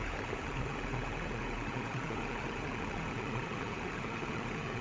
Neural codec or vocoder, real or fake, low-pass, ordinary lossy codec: codec, 16 kHz, 16 kbps, FunCodec, trained on LibriTTS, 50 frames a second; fake; none; none